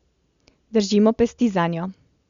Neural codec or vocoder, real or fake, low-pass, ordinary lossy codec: none; real; 7.2 kHz; Opus, 64 kbps